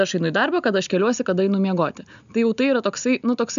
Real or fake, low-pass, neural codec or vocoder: real; 7.2 kHz; none